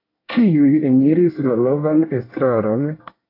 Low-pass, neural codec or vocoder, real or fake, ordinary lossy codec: 5.4 kHz; codec, 24 kHz, 1 kbps, SNAC; fake; AAC, 32 kbps